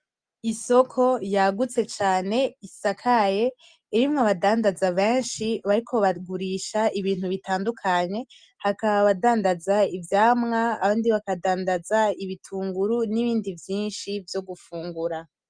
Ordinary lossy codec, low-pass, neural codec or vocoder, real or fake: Opus, 32 kbps; 9.9 kHz; none; real